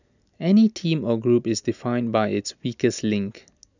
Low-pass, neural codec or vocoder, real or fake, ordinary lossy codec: 7.2 kHz; none; real; none